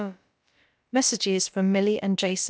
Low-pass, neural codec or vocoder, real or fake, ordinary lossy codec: none; codec, 16 kHz, about 1 kbps, DyCAST, with the encoder's durations; fake; none